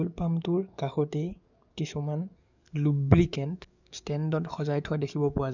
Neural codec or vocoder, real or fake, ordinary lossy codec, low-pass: codec, 44.1 kHz, 7.8 kbps, DAC; fake; none; 7.2 kHz